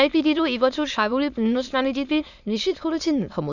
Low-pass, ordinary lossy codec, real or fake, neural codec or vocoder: 7.2 kHz; none; fake; autoencoder, 22.05 kHz, a latent of 192 numbers a frame, VITS, trained on many speakers